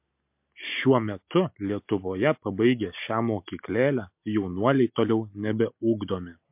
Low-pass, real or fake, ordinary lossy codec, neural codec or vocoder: 3.6 kHz; real; MP3, 32 kbps; none